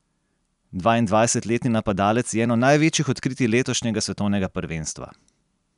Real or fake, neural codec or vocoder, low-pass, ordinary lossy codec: real; none; 10.8 kHz; none